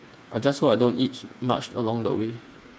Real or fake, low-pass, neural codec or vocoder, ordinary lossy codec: fake; none; codec, 16 kHz, 4 kbps, FreqCodec, smaller model; none